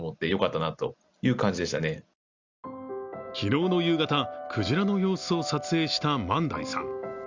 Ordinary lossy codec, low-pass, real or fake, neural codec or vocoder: Opus, 64 kbps; 7.2 kHz; real; none